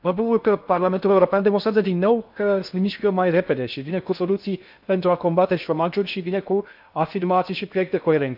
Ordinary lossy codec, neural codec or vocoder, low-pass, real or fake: none; codec, 16 kHz in and 24 kHz out, 0.6 kbps, FocalCodec, streaming, 2048 codes; 5.4 kHz; fake